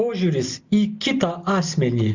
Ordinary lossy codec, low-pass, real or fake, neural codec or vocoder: Opus, 64 kbps; 7.2 kHz; real; none